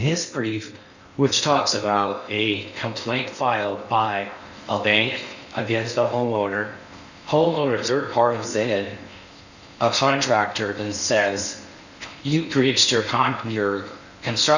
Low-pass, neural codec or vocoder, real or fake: 7.2 kHz; codec, 16 kHz in and 24 kHz out, 0.6 kbps, FocalCodec, streaming, 4096 codes; fake